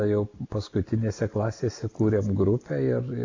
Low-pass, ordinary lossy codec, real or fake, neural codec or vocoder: 7.2 kHz; AAC, 48 kbps; real; none